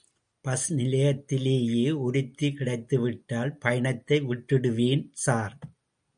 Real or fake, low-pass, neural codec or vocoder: real; 9.9 kHz; none